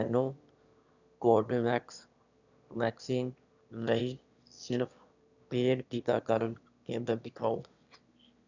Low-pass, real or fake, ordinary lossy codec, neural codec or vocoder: 7.2 kHz; fake; none; autoencoder, 22.05 kHz, a latent of 192 numbers a frame, VITS, trained on one speaker